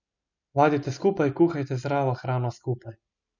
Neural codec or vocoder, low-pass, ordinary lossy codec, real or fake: none; 7.2 kHz; none; real